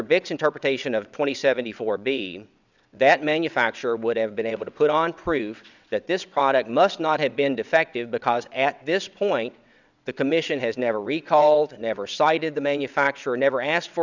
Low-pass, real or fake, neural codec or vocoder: 7.2 kHz; fake; vocoder, 22.05 kHz, 80 mel bands, WaveNeXt